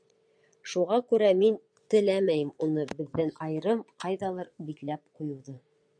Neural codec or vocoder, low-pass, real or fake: vocoder, 22.05 kHz, 80 mel bands, Vocos; 9.9 kHz; fake